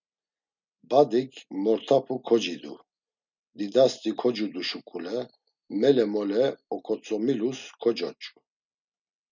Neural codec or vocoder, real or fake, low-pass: none; real; 7.2 kHz